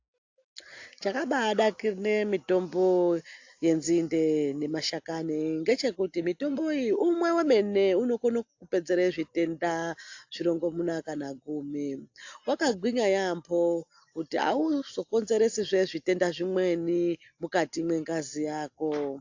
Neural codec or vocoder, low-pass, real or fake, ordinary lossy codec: none; 7.2 kHz; real; AAC, 48 kbps